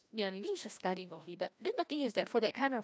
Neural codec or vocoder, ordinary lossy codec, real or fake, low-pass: codec, 16 kHz, 1 kbps, FreqCodec, larger model; none; fake; none